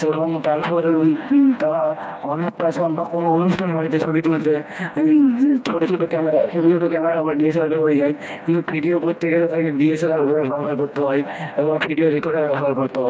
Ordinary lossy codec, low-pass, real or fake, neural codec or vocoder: none; none; fake; codec, 16 kHz, 1 kbps, FreqCodec, smaller model